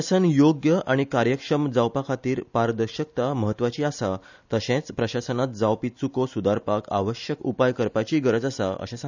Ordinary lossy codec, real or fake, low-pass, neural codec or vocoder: none; real; 7.2 kHz; none